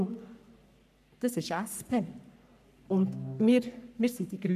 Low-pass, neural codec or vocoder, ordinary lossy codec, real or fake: 14.4 kHz; codec, 44.1 kHz, 3.4 kbps, Pupu-Codec; none; fake